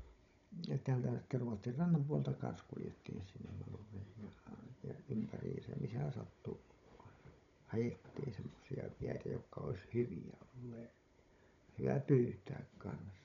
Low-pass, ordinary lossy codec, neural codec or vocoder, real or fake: 7.2 kHz; MP3, 96 kbps; codec, 16 kHz, 16 kbps, FunCodec, trained on Chinese and English, 50 frames a second; fake